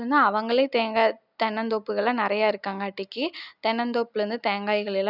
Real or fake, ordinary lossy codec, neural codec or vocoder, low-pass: real; none; none; 5.4 kHz